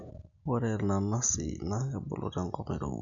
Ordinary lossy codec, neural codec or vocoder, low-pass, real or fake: none; none; 7.2 kHz; real